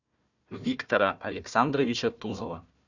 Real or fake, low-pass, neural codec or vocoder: fake; 7.2 kHz; codec, 16 kHz, 1 kbps, FunCodec, trained on Chinese and English, 50 frames a second